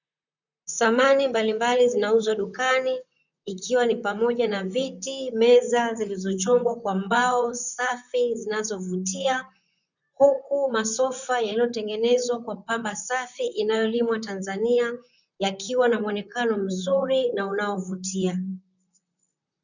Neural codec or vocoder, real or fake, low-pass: vocoder, 44.1 kHz, 128 mel bands, Pupu-Vocoder; fake; 7.2 kHz